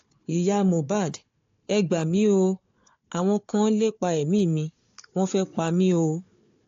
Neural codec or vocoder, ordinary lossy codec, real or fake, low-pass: codec, 16 kHz, 4 kbps, FunCodec, trained on Chinese and English, 50 frames a second; AAC, 48 kbps; fake; 7.2 kHz